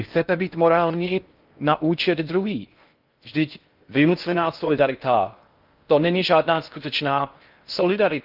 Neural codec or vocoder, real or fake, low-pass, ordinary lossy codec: codec, 16 kHz in and 24 kHz out, 0.6 kbps, FocalCodec, streaming, 4096 codes; fake; 5.4 kHz; Opus, 24 kbps